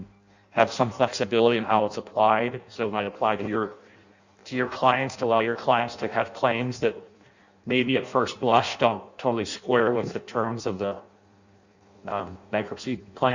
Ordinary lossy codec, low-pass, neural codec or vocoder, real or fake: Opus, 64 kbps; 7.2 kHz; codec, 16 kHz in and 24 kHz out, 0.6 kbps, FireRedTTS-2 codec; fake